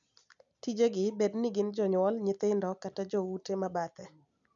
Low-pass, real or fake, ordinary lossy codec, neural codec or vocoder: 7.2 kHz; real; none; none